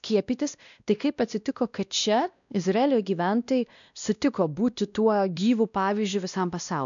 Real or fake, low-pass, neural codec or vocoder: fake; 7.2 kHz; codec, 16 kHz, 1 kbps, X-Codec, WavLM features, trained on Multilingual LibriSpeech